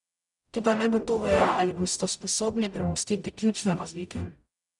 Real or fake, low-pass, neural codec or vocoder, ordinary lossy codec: fake; 10.8 kHz; codec, 44.1 kHz, 0.9 kbps, DAC; none